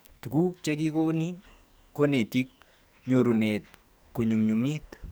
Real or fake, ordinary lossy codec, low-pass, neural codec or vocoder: fake; none; none; codec, 44.1 kHz, 2.6 kbps, SNAC